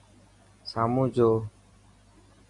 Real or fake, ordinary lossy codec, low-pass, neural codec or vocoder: real; AAC, 48 kbps; 10.8 kHz; none